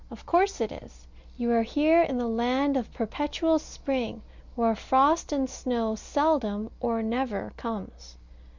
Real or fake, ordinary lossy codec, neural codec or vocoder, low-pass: real; Opus, 64 kbps; none; 7.2 kHz